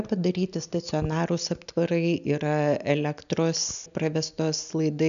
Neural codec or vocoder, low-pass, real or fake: none; 7.2 kHz; real